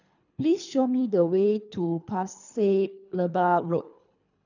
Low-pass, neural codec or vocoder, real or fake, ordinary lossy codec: 7.2 kHz; codec, 24 kHz, 3 kbps, HILCodec; fake; none